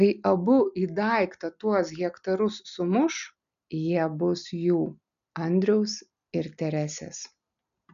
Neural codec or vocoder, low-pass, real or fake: none; 7.2 kHz; real